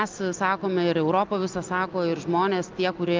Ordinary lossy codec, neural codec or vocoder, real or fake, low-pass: Opus, 24 kbps; none; real; 7.2 kHz